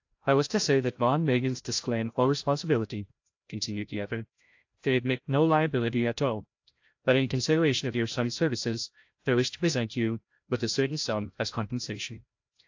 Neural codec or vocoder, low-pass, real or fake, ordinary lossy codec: codec, 16 kHz, 0.5 kbps, FreqCodec, larger model; 7.2 kHz; fake; AAC, 48 kbps